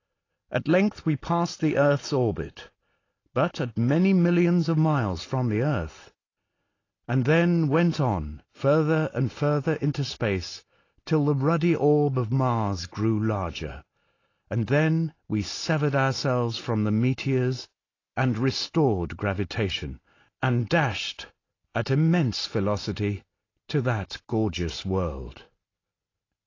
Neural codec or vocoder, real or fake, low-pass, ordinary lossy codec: none; real; 7.2 kHz; AAC, 32 kbps